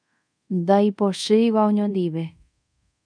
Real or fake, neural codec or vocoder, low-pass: fake; codec, 24 kHz, 0.5 kbps, DualCodec; 9.9 kHz